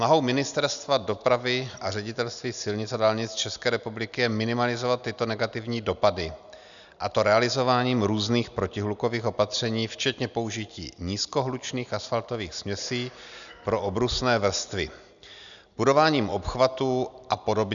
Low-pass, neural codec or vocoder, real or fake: 7.2 kHz; none; real